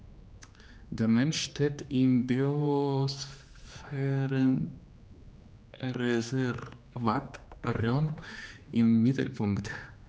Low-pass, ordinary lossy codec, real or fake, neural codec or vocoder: none; none; fake; codec, 16 kHz, 2 kbps, X-Codec, HuBERT features, trained on general audio